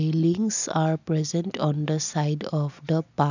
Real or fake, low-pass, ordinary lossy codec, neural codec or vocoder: real; 7.2 kHz; none; none